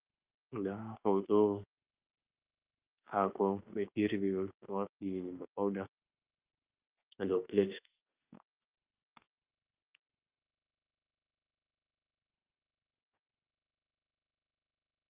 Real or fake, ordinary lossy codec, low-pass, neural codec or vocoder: fake; Opus, 32 kbps; 3.6 kHz; autoencoder, 48 kHz, 32 numbers a frame, DAC-VAE, trained on Japanese speech